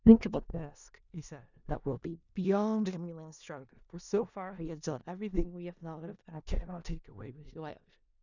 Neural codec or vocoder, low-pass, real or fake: codec, 16 kHz in and 24 kHz out, 0.4 kbps, LongCat-Audio-Codec, four codebook decoder; 7.2 kHz; fake